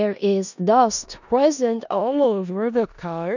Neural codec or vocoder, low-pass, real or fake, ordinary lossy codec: codec, 16 kHz in and 24 kHz out, 0.4 kbps, LongCat-Audio-Codec, four codebook decoder; 7.2 kHz; fake; none